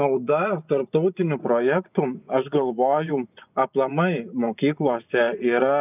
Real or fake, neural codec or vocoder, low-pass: fake; vocoder, 24 kHz, 100 mel bands, Vocos; 3.6 kHz